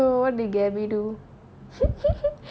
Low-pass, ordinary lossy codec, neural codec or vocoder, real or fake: none; none; none; real